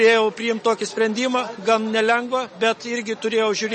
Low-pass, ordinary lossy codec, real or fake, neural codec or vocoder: 9.9 kHz; MP3, 32 kbps; real; none